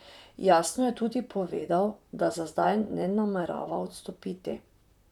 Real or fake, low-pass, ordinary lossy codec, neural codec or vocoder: fake; 19.8 kHz; none; vocoder, 44.1 kHz, 128 mel bands, Pupu-Vocoder